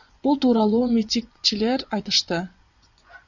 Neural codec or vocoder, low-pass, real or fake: vocoder, 24 kHz, 100 mel bands, Vocos; 7.2 kHz; fake